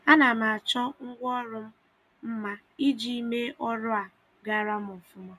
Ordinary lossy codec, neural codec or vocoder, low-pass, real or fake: none; none; 14.4 kHz; real